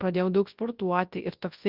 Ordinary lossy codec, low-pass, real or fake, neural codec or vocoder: Opus, 32 kbps; 5.4 kHz; fake; codec, 24 kHz, 0.9 kbps, WavTokenizer, large speech release